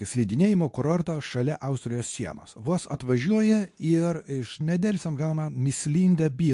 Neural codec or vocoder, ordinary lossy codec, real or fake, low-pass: codec, 24 kHz, 0.9 kbps, WavTokenizer, medium speech release version 2; AAC, 64 kbps; fake; 10.8 kHz